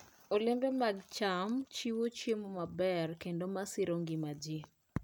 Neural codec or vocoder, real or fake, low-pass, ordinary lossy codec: none; real; none; none